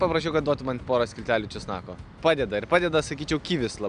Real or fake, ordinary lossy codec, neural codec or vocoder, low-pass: real; AAC, 96 kbps; none; 9.9 kHz